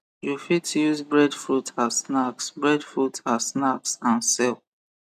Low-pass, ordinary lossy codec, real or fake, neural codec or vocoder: 14.4 kHz; none; real; none